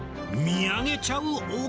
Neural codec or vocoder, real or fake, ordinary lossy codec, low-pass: none; real; none; none